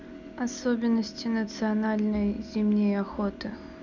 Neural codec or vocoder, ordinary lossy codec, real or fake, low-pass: none; none; real; 7.2 kHz